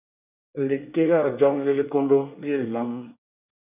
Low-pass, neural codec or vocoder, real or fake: 3.6 kHz; codec, 24 kHz, 1 kbps, SNAC; fake